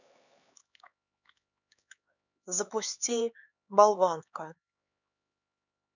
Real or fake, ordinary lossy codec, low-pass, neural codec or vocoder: fake; none; 7.2 kHz; codec, 16 kHz, 4 kbps, X-Codec, HuBERT features, trained on LibriSpeech